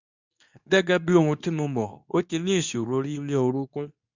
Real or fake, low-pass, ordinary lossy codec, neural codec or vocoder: fake; 7.2 kHz; none; codec, 24 kHz, 0.9 kbps, WavTokenizer, medium speech release version 2